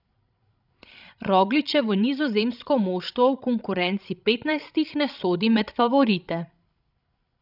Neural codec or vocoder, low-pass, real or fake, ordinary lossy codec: codec, 16 kHz, 16 kbps, FreqCodec, larger model; 5.4 kHz; fake; none